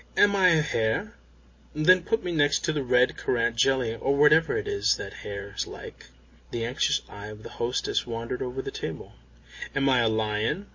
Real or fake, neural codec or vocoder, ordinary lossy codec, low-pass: real; none; MP3, 32 kbps; 7.2 kHz